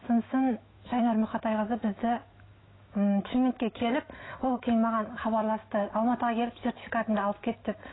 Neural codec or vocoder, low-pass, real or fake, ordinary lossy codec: autoencoder, 48 kHz, 128 numbers a frame, DAC-VAE, trained on Japanese speech; 7.2 kHz; fake; AAC, 16 kbps